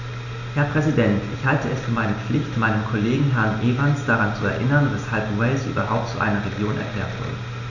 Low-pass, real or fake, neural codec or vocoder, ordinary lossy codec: 7.2 kHz; real; none; none